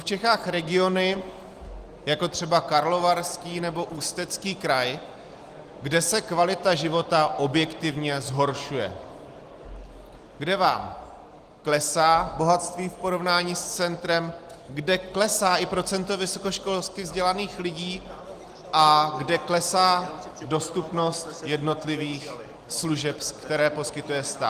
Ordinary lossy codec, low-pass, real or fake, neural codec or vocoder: Opus, 24 kbps; 14.4 kHz; real; none